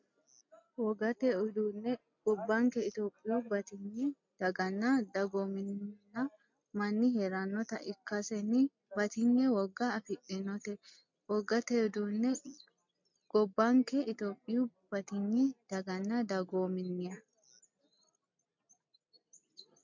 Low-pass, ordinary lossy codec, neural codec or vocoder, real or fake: 7.2 kHz; MP3, 48 kbps; none; real